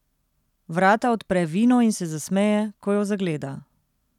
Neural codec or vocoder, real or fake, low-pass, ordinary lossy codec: none; real; 19.8 kHz; none